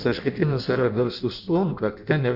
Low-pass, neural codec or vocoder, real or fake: 5.4 kHz; codec, 16 kHz in and 24 kHz out, 0.6 kbps, FireRedTTS-2 codec; fake